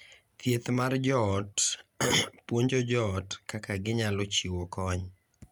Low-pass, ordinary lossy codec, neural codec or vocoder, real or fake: none; none; none; real